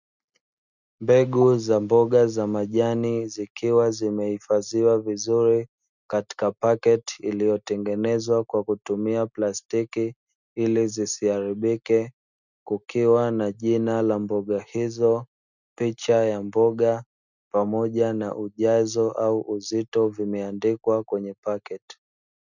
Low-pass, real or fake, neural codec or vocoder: 7.2 kHz; real; none